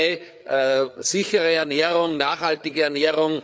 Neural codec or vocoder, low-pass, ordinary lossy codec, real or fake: codec, 16 kHz, 4 kbps, FreqCodec, larger model; none; none; fake